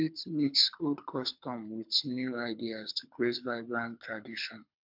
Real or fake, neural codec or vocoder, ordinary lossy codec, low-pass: fake; codec, 24 kHz, 6 kbps, HILCodec; MP3, 48 kbps; 5.4 kHz